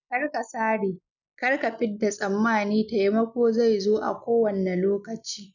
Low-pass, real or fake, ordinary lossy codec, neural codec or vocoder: 7.2 kHz; real; none; none